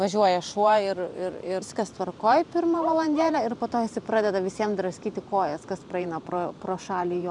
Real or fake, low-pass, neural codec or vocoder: fake; 10.8 kHz; vocoder, 44.1 kHz, 128 mel bands every 512 samples, BigVGAN v2